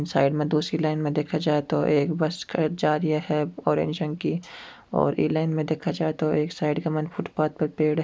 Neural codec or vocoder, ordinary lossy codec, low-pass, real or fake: none; none; none; real